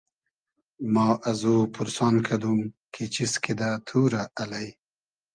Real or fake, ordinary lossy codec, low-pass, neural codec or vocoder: real; Opus, 32 kbps; 9.9 kHz; none